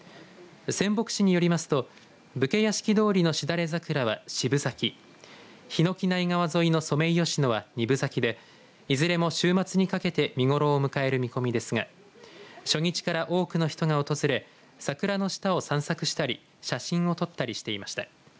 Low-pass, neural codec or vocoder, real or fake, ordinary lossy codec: none; none; real; none